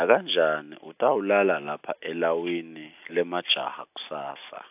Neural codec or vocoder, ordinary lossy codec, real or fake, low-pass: none; AAC, 32 kbps; real; 3.6 kHz